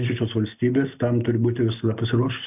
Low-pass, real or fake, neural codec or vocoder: 3.6 kHz; real; none